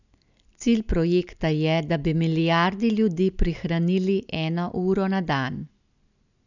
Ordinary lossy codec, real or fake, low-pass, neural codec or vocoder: none; real; 7.2 kHz; none